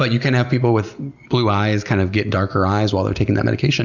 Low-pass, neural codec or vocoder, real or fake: 7.2 kHz; none; real